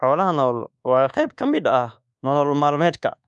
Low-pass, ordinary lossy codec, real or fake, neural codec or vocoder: 10.8 kHz; none; fake; codec, 24 kHz, 1.2 kbps, DualCodec